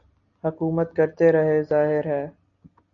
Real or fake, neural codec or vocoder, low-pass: real; none; 7.2 kHz